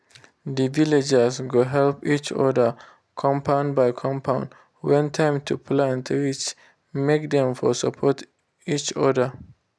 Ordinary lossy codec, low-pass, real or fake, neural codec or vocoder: none; none; real; none